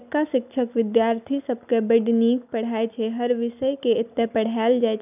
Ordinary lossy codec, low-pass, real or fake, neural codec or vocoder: none; 3.6 kHz; real; none